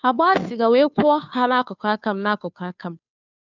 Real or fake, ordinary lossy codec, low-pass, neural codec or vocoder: fake; none; 7.2 kHz; codec, 16 kHz, 2 kbps, FunCodec, trained on Chinese and English, 25 frames a second